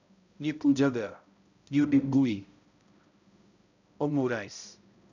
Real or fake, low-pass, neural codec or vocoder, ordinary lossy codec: fake; 7.2 kHz; codec, 16 kHz, 0.5 kbps, X-Codec, HuBERT features, trained on balanced general audio; none